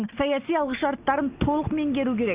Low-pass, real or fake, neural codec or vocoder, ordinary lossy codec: 3.6 kHz; real; none; Opus, 64 kbps